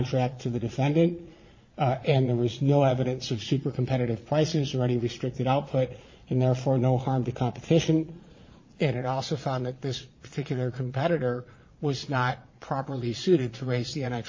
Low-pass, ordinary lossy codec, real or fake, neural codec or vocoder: 7.2 kHz; MP3, 32 kbps; fake; vocoder, 22.05 kHz, 80 mel bands, Vocos